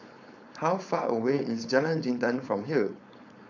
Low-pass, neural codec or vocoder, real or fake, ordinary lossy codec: 7.2 kHz; codec, 16 kHz, 4.8 kbps, FACodec; fake; none